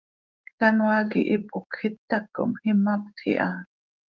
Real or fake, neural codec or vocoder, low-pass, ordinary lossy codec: fake; codec, 16 kHz in and 24 kHz out, 1 kbps, XY-Tokenizer; 7.2 kHz; Opus, 32 kbps